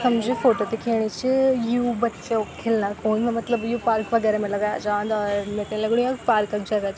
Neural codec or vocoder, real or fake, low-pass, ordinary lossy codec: none; real; none; none